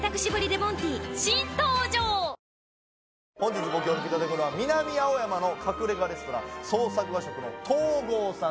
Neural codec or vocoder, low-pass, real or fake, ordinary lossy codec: none; none; real; none